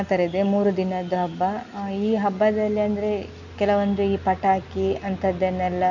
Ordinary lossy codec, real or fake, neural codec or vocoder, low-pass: none; real; none; 7.2 kHz